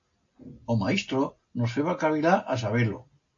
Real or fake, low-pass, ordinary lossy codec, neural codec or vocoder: real; 7.2 kHz; AAC, 48 kbps; none